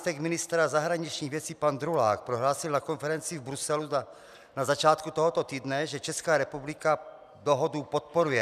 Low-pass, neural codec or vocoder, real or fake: 14.4 kHz; none; real